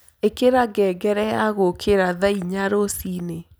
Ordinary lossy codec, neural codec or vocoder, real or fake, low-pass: none; none; real; none